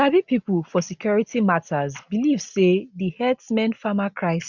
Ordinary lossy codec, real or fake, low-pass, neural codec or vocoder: none; real; 7.2 kHz; none